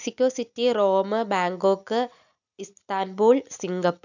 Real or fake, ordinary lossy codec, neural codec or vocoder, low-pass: real; none; none; 7.2 kHz